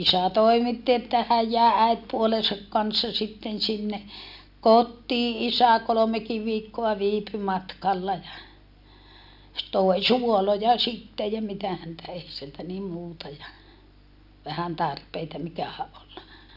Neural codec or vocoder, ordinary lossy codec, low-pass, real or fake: none; none; 5.4 kHz; real